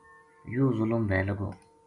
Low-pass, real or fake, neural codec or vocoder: 10.8 kHz; real; none